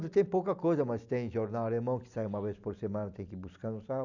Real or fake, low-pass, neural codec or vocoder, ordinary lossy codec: real; 7.2 kHz; none; none